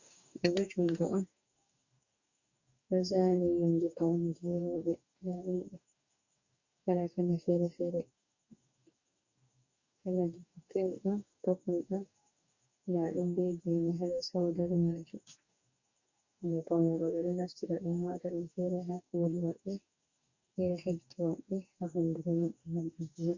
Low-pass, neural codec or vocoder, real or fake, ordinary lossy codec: 7.2 kHz; codec, 44.1 kHz, 2.6 kbps, DAC; fake; Opus, 64 kbps